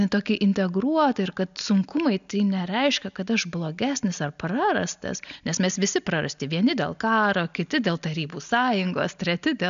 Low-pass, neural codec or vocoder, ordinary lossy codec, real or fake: 7.2 kHz; none; AAC, 96 kbps; real